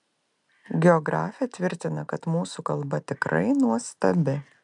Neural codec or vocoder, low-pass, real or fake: none; 10.8 kHz; real